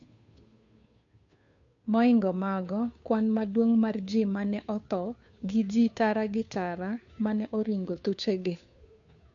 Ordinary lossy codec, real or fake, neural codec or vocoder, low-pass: none; fake; codec, 16 kHz, 2 kbps, FunCodec, trained on Chinese and English, 25 frames a second; 7.2 kHz